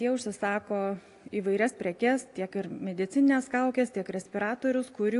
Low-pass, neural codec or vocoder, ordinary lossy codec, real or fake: 10.8 kHz; none; AAC, 48 kbps; real